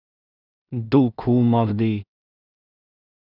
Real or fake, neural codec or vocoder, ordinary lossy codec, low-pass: fake; codec, 16 kHz in and 24 kHz out, 0.4 kbps, LongCat-Audio-Codec, two codebook decoder; none; 5.4 kHz